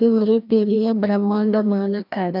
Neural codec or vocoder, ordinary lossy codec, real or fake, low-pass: codec, 16 kHz, 1 kbps, FreqCodec, larger model; none; fake; 5.4 kHz